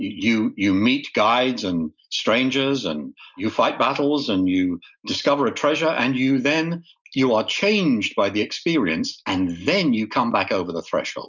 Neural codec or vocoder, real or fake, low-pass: none; real; 7.2 kHz